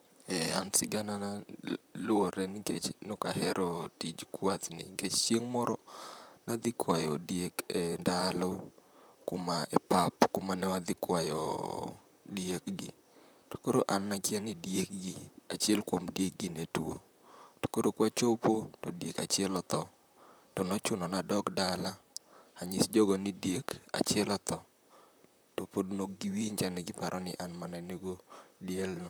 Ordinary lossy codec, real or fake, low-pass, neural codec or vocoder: none; fake; none; vocoder, 44.1 kHz, 128 mel bands, Pupu-Vocoder